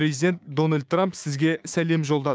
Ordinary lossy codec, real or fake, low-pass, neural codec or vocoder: none; fake; none; codec, 16 kHz, 6 kbps, DAC